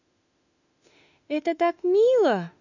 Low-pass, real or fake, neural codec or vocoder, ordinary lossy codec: 7.2 kHz; fake; autoencoder, 48 kHz, 32 numbers a frame, DAC-VAE, trained on Japanese speech; none